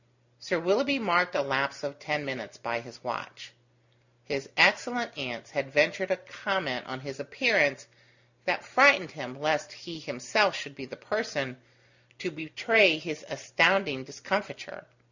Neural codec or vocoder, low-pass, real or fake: none; 7.2 kHz; real